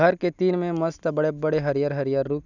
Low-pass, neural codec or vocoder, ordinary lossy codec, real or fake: 7.2 kHz; none; none; real